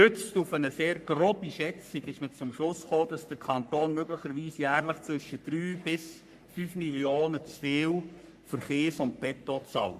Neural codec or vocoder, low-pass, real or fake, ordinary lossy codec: codec, 44.1 kHz, 3.4 kbps, Pupu-Codec; 14.4 kHz; fake; MP3, 96 kbps